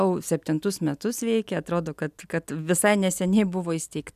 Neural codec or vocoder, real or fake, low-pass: none; real; 14.4 kHz